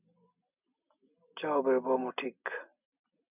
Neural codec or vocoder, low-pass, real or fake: none; 3.6 kHz; real